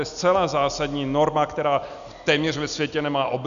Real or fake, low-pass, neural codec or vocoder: real; 7.2 kHz; none